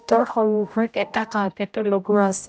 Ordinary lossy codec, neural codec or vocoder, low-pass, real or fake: none; codec, 16 kHz, 0.5 kbps, X-Codec, HuBERT features, trained on general audio; none; fake